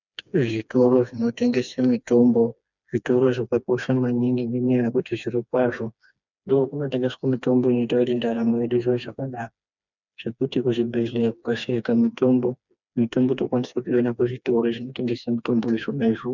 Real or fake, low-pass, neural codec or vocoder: fake; 7.2 kHz; codec, 16 kHz, 2 kbps, FreqCodec, smaller model